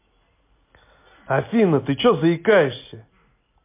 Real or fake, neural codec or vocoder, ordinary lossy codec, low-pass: real; none; MP3, 24 kbps; 3.6 kHz